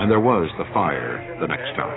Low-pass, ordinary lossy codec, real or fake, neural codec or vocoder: 7.2 kHz; AAC, 16 kbps; fake; codec, 16 kHz, 6 kbps, DAC